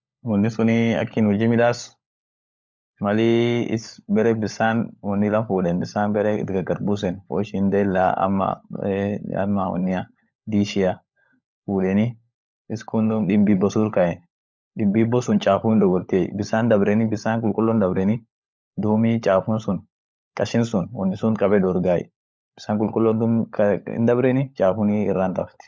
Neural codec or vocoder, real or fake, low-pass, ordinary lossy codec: codec, 16 kHz, 16 kbps, FunCodec, trained on LibriTTS, 50 frames a second; fake; none; none